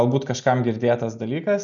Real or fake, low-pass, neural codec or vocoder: real; 7.2 kHz; none